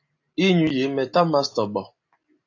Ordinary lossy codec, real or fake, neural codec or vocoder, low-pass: AAC, 48 kbps; real; none; 7.2 kHz